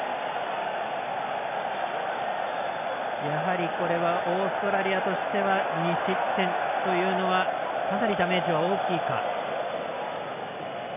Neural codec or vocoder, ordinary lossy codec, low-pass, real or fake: none; none; 3.6 kHz; real